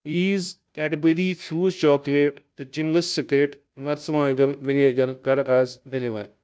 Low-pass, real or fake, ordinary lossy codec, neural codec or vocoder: none; fake; none; codec, 16 kHz, 0.5 kbps, FunCodec, trained on Chinese and English, 25 frames a second